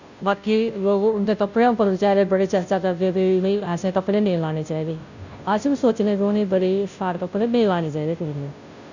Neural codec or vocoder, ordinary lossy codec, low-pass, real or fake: codec, 16 kHz, 0.5 kbps, FunCodec, trained on Chinese and English, 25 frames a second; none; 7.2 kHz; fake